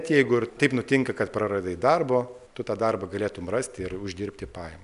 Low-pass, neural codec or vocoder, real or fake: 10.8 kHz; none; real